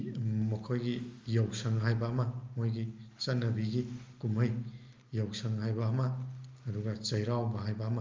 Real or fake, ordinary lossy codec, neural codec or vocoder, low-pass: real; Opus, 32 kbps; none; 7.2 kHz